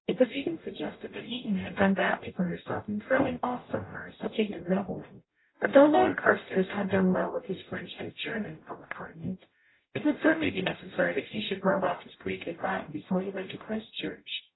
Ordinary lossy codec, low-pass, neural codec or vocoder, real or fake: AAC, 16 kbps; 7.2 kHz; codec, 44.1 kHz, 0.9 kbps, DAC; fake